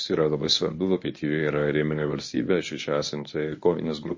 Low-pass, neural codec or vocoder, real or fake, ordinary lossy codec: 7.2 kHz; codec, 24 kHz, 0.9 kbps, WavTokenizer, medium speech release version 1; fake; MP3, 32 kbps